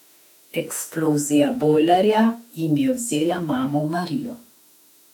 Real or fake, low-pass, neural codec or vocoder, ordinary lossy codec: fake; 19.8 kHz; autoencoder, 48 kHz, 32 numbers a frame, DAC-VAE, trained on Japanese speech; none